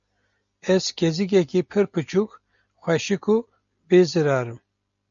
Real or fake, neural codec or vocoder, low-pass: real; none; 7.2 kHz